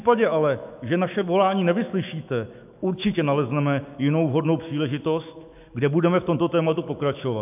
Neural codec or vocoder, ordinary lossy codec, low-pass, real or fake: autoencoder, 48 kHz, 128 numbers a frame, DAC-VAE, trained on Japanese speech; AAC, 32 kbps; 3.6 kHz; fake